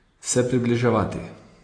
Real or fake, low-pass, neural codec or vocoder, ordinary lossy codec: real; 9.9 kHz; none; AAC, 32 kbps